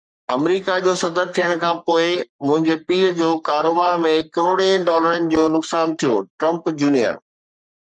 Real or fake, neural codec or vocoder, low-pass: fake; codec, 44.1 kHz, 3.4 kbps, Pupu-Codec; 9.9 kHz